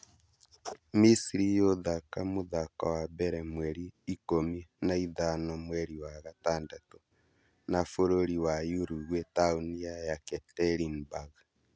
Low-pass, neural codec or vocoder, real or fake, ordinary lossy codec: none; none; real; none